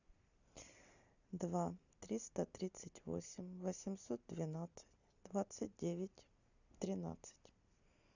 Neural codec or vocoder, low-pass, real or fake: none; 7.2 kHz; real